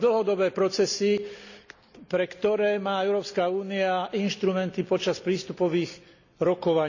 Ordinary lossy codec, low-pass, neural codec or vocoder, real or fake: none; 7.2 kHz; none; real